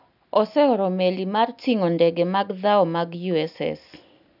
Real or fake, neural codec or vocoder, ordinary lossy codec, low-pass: real; none; none; 5.4 kHz